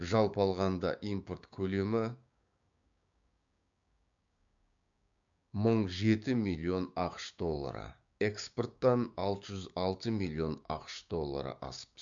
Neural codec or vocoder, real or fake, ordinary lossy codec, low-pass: codec, 16 kHz, 6 kbps, DAC; fake; MP3, 64 kbps; 7.2 kHz